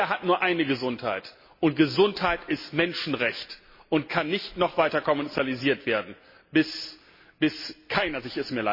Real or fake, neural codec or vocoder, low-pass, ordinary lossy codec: real; none; 5.4 kHz; MP3, 24 kbps